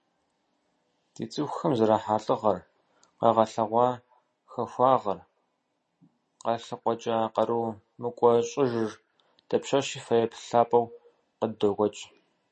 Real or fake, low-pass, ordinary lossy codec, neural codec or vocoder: real; 10.8 kHz; MP3, 32 kbps; none